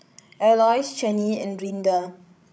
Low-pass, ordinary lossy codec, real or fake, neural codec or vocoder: none; none; fake; codec, 16 kHz, 16 kbps, FreqCodec, larger model